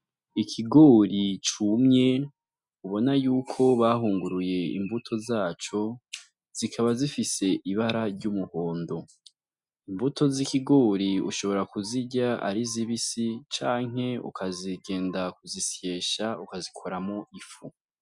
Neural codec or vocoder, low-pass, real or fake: none; 10.8 kHz; real